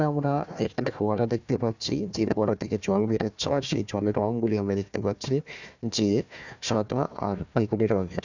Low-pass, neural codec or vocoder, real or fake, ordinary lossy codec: 7.2 kHz; codec, 16 kHz, 1 kbps, FunCodec, trained on Chinese and English, 50 frames a second; fake; none